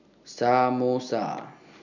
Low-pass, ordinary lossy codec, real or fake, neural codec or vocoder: 7.2 kHz; none; real; none